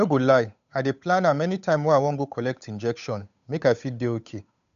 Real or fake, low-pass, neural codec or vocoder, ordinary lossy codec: fake; 7.2 kHz; codec, 16 kHz, 8 kbps, FunCodec, trained on Chinese and English, 25 frames a second; none